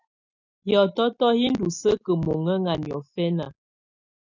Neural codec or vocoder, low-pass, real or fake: none; 7.2 kHz; real